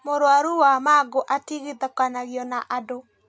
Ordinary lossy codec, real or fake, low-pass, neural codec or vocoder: none; real; none; none